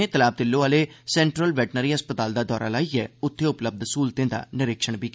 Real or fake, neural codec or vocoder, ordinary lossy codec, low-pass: real; none; none; none